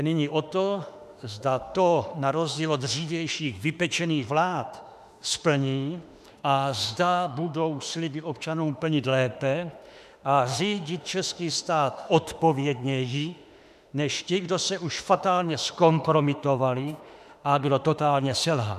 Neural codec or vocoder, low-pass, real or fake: autoencoder, 48 kHz, 32 numbers a frame, DAC-VAE, trained on Japanese speech; 14.4 kHz; fake